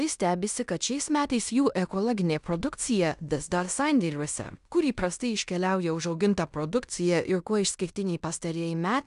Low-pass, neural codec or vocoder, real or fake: 10.8 kHz; codec, 16 kHz in and 24 kHz out, 0.9 kbps, LongCat-Audio-Codec, fine tuned four codebook decoder; fake